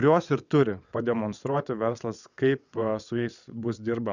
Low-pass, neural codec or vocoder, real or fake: 7.2 kHz; vocoder, 22.05 kHz, 80 mel bands, WaveNeXt; fake